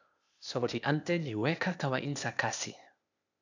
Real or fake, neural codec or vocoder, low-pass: fake; codec, 16 kHz, 0.8 kbps, ZipCodec; 7.2 kHz